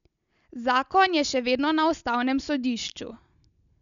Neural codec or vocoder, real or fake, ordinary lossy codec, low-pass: none; real; none; 7.2 kHz